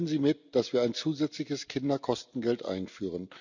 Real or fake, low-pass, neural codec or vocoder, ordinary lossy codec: real; 7.2 kHz; none; none